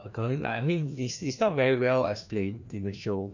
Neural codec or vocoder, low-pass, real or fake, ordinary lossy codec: codec, 16 kHz, 1 kbps, FreqCodec, larger model; 7.2 kHz; fake; none